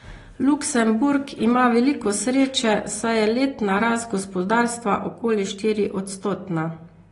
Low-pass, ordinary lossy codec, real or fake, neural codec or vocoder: 10.8 kHz; AAC, 32 kbps; real; none